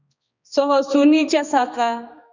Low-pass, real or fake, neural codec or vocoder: 7.2 kHz; fake; codec, 16 kHz, 2 kbps, X-Codec, HuBERT features, trained on balanced general audio